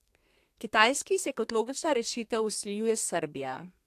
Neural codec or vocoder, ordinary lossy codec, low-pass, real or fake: codec, 32 kHz, 1.9 kbps, SNAC; AAC, 64 kbps; 14.4 kHz; fake